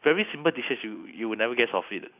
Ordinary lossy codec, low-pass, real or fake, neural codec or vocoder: none; 3.6 kHz; real; none